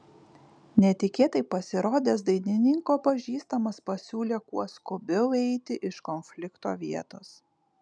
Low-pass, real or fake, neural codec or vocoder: 9.9 kHz; real; none